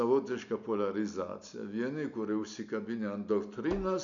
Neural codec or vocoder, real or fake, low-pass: none; real; 7.2 kHz